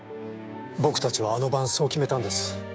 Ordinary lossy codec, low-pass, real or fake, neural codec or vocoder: none; none; fake; codec, 16 kHz, 6 kbps, DAC